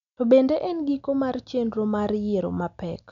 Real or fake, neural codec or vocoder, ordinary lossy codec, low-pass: real; none; none; 7.2 kHz